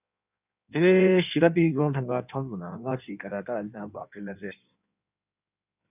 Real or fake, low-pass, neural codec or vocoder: fake; 3.6 kHz; codec, 16 kHz in and 24 kHz out, 1.1 kbps, FireRedTTS-2 codec